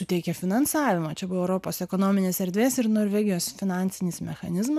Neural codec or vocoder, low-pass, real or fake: none; 14.4 kHz; real